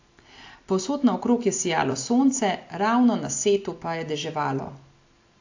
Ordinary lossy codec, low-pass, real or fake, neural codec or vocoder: AAC, 48 kbps; 7.2 kHz; real; none